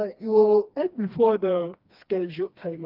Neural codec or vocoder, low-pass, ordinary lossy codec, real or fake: codec, 16 kHz, 2 kbps, FreqCodec, smaller model; 5.4 kHz; Opus, 32 kbps; fake